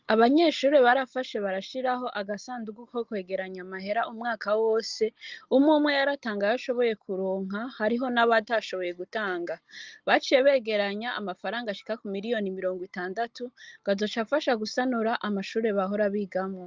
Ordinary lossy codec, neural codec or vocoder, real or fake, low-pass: Opus, 32 kbps; none; real; 7.2 kHz